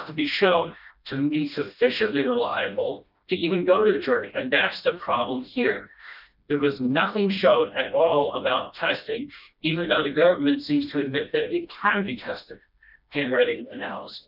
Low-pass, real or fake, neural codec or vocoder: 5.4 kHz; fake; codec, 16 kHz, 1 kbps, FreqCodec, smaller model